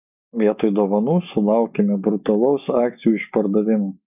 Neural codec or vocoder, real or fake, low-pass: none; real; 3.6 kHz